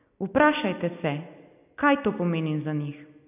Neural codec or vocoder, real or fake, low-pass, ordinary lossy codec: none; real; 3.6 kHz; none